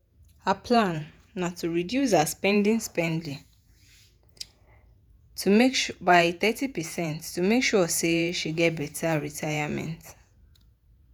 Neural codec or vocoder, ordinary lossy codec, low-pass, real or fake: vocoder, 48 kHz, 128 mel bands, Vocos; none; none; fake